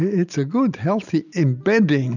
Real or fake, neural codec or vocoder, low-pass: real; none; 7.2 kHz